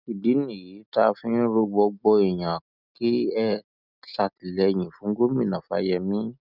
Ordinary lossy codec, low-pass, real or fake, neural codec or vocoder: none; 5.4 kHz; real; none